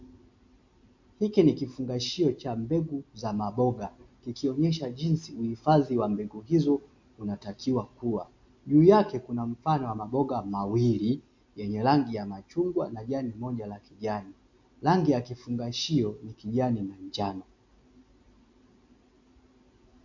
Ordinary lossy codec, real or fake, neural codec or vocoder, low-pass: MP3, 48 kbps; real; none; 7.2 kHz